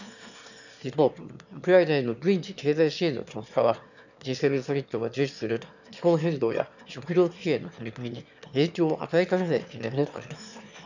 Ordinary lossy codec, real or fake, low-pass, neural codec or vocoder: none; fake; 7.2 kHz; autoencoder, 22.05 kHz, a latent of 192 numbers a frame, VITS, trained on one speaker